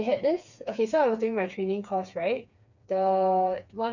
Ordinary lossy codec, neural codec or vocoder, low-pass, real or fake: none; codec, 16 kHz, 4 kbps, FreqCodec, smaller model; 7.2 kHz; fake